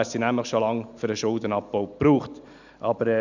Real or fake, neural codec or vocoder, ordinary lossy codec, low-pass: real; none; none; 7.2 kHz